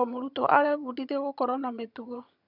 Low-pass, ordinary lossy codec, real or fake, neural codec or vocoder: 5.4 kHz; none; fake; vocoder, 22.05 kHz, 80 mel bands, HiFi-GAN